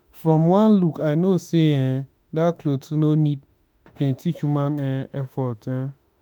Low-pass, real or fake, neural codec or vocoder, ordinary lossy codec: none; fake; autoencoder, 48 kHz, 32 numbers a frame, DAC-VAE, trained on Japanese speech; none